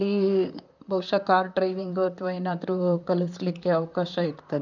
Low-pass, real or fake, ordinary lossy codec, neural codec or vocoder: 7.2 kHz; fake; none; vocoder, 44.1 kHz, 128 mel bands, Pupu-Vocoder